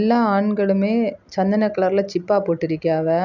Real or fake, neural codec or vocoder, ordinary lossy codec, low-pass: real; none; none; 7.2 kHz